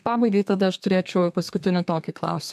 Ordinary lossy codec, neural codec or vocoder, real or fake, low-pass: AAC, 96 kbps; codec, 32 kHz, 1.9 kbps, SNAC; fake; 14.4 kHz